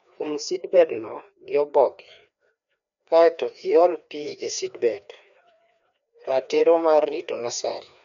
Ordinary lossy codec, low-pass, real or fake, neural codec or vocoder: none; 7.2 kHz; fake; codec, 16 kHz, 2 kbps, FreqCodec, larger model